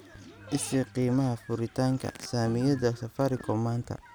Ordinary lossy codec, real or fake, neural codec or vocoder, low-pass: none; real; none; none